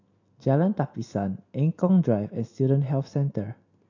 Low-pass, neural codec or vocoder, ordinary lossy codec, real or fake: 7.2 kHz; none; AAC, 48 kbps; real